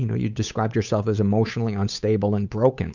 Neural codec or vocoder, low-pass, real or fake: codec, 16 kHz, 8 kbps, FunCodec, trained on Chinese and English, 25 frames a second; 7.2 kHz; fake